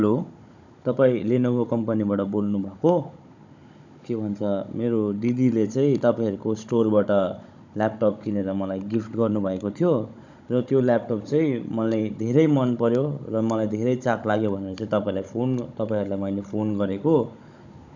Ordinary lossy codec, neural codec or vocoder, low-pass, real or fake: none; codec, 16 kHz, 16 kbps, FunCodec, trained on Chinese and English, 50 frames a second; 7.2 kHz; fake